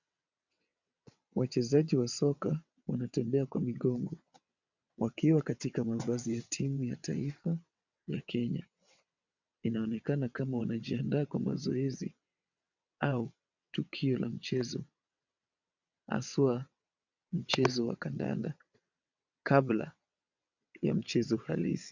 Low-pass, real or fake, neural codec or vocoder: 7.2 kHz; fake; vocoder, 22.05 kHz, 80 mel bands, WaveNeXt